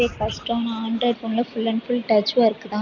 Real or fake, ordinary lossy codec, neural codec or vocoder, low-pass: real; none; none; 7.2 kHz